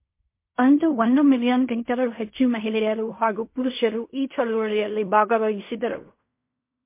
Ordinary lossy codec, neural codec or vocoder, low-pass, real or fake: MP3, 24 kbps; codec, 16 kHz in and 24 kHz out, 0.4 kbps, LongCat-Audio-Codec, fine tuned four codebook decoder; 3.6 kHz; fake